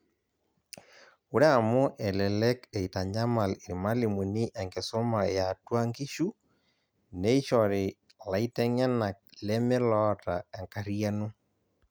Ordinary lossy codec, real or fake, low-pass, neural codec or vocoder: none; real; none; none